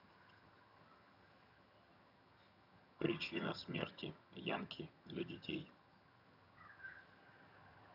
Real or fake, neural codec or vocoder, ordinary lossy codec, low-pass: fake; vocoder, 22.05 kHz, 80 mel bands, HiFi-GAN; none; 5.4 kHz